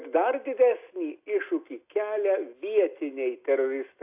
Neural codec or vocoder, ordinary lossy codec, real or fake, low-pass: none; AAC, 32 kbps; real; 3.6 kHz